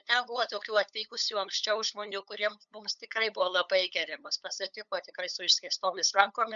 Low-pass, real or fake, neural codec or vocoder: 7.2 kHz; fake; codec, 16 kHz, 8 kbps, FunCodec, trained on LibriTTS, 25 frames a second